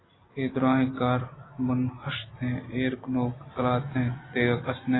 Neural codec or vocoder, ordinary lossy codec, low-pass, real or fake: none; AAC, 16 kbps; 7.2 kHz; real